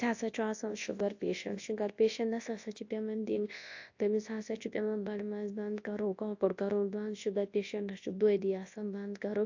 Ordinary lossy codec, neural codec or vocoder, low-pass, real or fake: AAC, 48 kbps; codec, 24 kHz, 0.9 kbps, WavTokenizer, large speech release; 7.2 kHz; fake